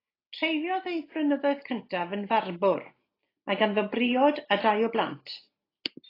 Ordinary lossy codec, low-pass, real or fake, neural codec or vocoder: AAC, 24 kbps; 5.4 kHz; real; none